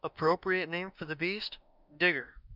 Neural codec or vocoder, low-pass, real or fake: autoencoder, 48 kHz, 32 numbers a frame, DAC-VAE, trained on Japanese speech; 5.4 kHz; fake